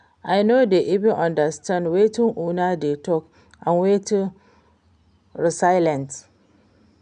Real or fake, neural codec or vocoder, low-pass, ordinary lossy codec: real; none; 9.9 kHz; none